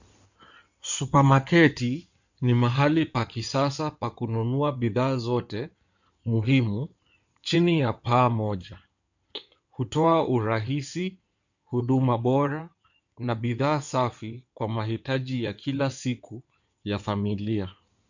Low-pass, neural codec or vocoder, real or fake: 7.2 kHz; codec, 16 kHz in and 24 kHz out, 2.2 kbps, FireRedTTS-2 codec; fake